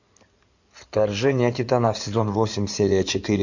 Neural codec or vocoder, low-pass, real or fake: codec, 16 kHz in and 24 kHz out, 2.2 kbps, FireRedTTS-2 codec; 7.2 kHz; fake